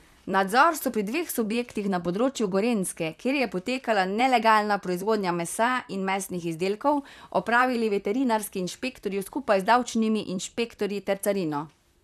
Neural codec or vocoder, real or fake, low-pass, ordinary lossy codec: vocoder, 44.1 kHz, 128 mel bands, Pupu-Vocoder; fake; 14.4 kHz; none